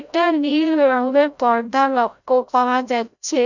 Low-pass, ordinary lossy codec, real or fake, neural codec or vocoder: 7.2 kHz; none; fake; codec, 16 kHz, 0.5 kbps, FreqCodec, larger model